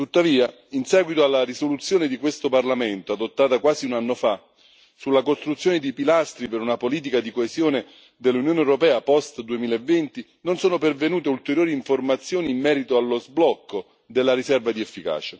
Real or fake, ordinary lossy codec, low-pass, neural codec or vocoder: real; none; none; none